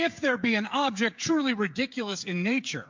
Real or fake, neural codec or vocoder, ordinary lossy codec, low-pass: fake; codec, 16 kHz, 8 kbps, FreqCodec, smaller model; MP3, 48 kbps; 7.2 kHz